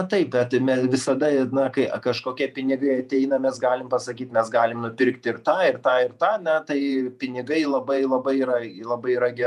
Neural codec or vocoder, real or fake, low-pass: vocoder, 48 kHz, 128 mel bands, Vocos; fake; 14.4 kHz